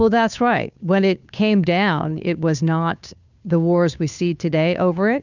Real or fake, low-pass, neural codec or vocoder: fake; 7.2 kHz; codec, 16 kHz, 2 kbps, FunCodec, trained on Chinese and English, 25 frames a second